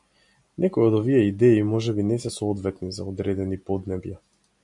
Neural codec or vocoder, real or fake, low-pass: none; real; 10.8 kHz